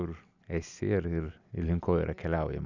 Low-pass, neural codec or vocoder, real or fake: 7.2 kHz; none; real